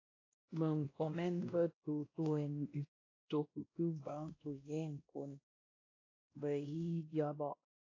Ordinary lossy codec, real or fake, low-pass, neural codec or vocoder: AAC, 32 kbps; fake; 7.2 kHz; codec, 16 kHz, 1 kbps, X-Codec, WavLM features, trained on Multilingual LibriSpeech